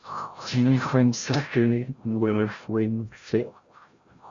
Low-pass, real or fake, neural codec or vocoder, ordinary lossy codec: 7.2 kHz; fake; codec, 16 kHz, 0.5 kbps, FreqCodec, larger model; Opus, 64 kbps